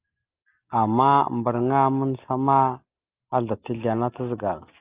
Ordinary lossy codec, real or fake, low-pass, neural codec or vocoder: Opus, 24 kbps; real; 3.6 kHz; none